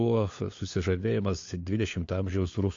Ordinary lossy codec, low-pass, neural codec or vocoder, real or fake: AAC, 48 kbps; 7.2 kHz; codec, 16 kHz, 4 kbps, FunCodec, trained on LibriTTS, 50 frames a second; fake